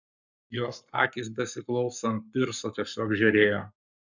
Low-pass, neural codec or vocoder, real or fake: 7.2 kHz; codec, 44.1 kHz, 7.8 kbps, Pupu-Codec; fake